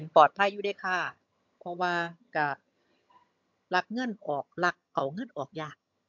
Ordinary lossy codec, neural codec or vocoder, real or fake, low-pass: MP3, 64 kbps; vocoder, 22.05 kHz, 80 mel bands, HiFi-GAN; fake; 7.2 kHz